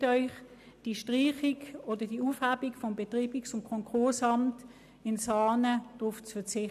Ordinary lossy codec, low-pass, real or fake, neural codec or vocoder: none; 14.4 kHz; real; none